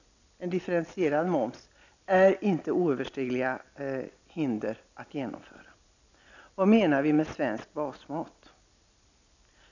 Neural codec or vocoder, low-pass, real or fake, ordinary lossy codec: none; 7.2 kHz; real; none